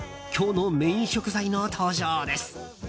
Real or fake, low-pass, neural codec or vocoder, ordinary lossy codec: real; none; none; none